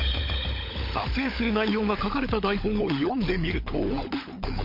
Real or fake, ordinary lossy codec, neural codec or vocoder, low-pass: fake; MP3, 32 kbps; codec, 16 kHz, 16 kbps, FunCodec, trained on LibriTTS, 50 frames a second; 5.4 kHz